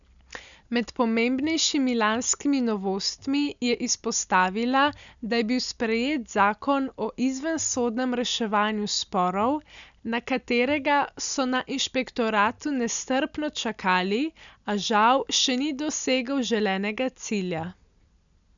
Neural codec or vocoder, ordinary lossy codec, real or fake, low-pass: none; none; real; 7.2 kHz